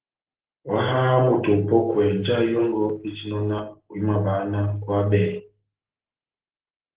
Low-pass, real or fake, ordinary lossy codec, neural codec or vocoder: 3.6 kHz; real; Opus, 16 kbps; none